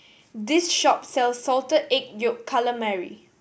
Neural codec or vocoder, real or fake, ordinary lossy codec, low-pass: none; real; none; none